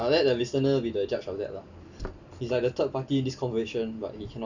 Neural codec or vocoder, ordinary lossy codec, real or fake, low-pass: none; none; real; 7.2 kHz